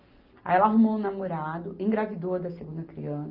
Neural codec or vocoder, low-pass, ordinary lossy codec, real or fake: none; 5.4 kHz; Opus, 24 kbps; real